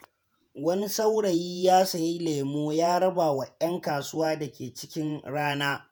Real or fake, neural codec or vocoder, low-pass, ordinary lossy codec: fake; vocoder, 48 kHz, 128 mel bands, Vocos; none; none